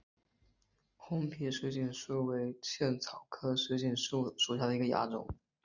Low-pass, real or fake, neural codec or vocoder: 7.2 kHz; real; none